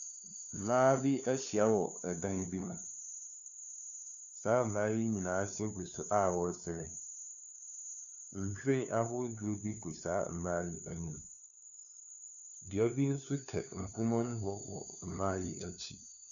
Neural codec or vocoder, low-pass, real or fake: codec, 16 kHz, 2 kbps, FunCodec, trained on LibriTTS, 25 frames a second; 7.2 kHz; fake